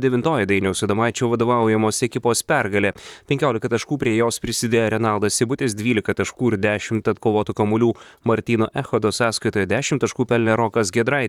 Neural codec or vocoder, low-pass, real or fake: vocoder, 44.1 kHz, 128 mel bands, Pupu-Vocoder; 19.8 kHz; fake